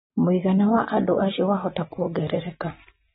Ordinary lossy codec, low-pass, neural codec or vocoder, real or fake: AAC, 16 kbps; 10.8 kHz; none; real